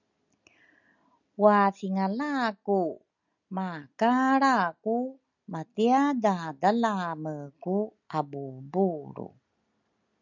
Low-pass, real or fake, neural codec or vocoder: 7.2 kHz; real; none